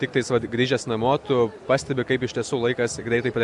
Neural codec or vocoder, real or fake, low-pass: none; real; 10.8 kHz